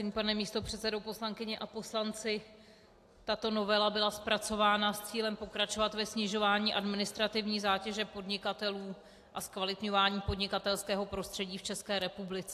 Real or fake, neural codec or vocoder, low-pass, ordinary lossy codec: real; none; 14.4 kHz; AAC, 64 kbps